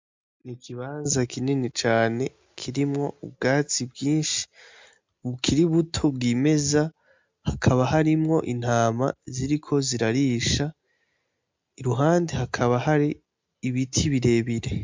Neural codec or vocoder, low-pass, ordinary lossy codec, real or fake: none; 7.2 kHz; MP3, 64 kbps; real